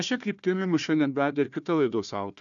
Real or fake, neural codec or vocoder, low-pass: fake; codec, 16 kHz, 1 kbps, FunCodec, trained on Chinese and English, 50 frames a second; 7.2 kHz